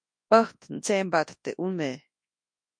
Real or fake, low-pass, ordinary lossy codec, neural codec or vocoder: fake; 9.9 kHz; MP3, 48 kbps; codec, 24 kHz, 0.9 kbps, WavTokenizer, large speech release